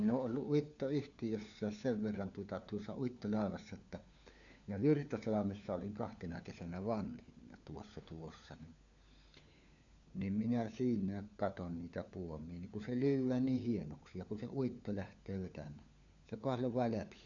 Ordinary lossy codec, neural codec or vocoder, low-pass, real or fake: none; codec, 16 kHz, 4 kbps, FunCodec, trained on LibriTTS, 50 frames a second; 7.2 kHz; fake